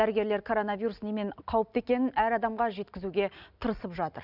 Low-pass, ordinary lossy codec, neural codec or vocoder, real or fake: 5.4 kHz; none; none; real